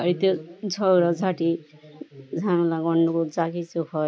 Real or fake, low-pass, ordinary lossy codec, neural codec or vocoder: real; none; none; none